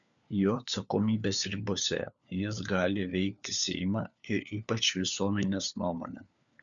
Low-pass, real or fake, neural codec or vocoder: 7.2 kHz; fake; codec, 16 kHz, 4 kbps, FunCodec, trained on LibriTTS, 50 frames a second